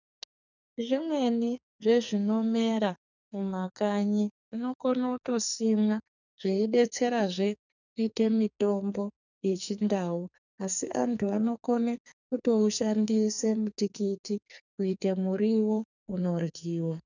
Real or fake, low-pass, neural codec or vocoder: fake; 7.2 kHz; codec, 44.1 kHz, 2.6 kbps, SNAC